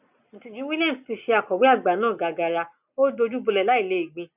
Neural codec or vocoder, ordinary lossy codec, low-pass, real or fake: none; none; 3.6 kHz; real